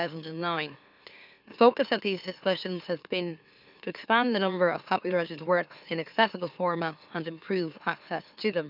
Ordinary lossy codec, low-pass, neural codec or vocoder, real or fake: none; 5.4 kHz; autoencoder, 44.1 kHz, a latent of 192 numbers a frame, MeloTTS; fake